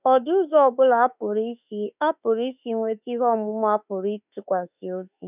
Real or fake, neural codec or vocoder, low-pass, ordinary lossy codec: fake; autoencoder, 48 kHz, 32 numbers a frame, DAC-VAE, trained on Japanese speech; 3.6 kHz; none